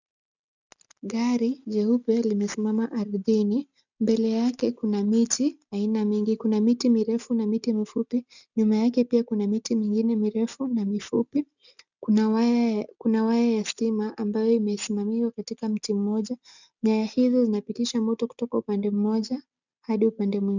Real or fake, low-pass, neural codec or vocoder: real; 7.2 kHz; none